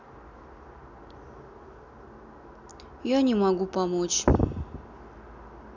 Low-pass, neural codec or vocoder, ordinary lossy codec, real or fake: 7.2 kHz; none; none; real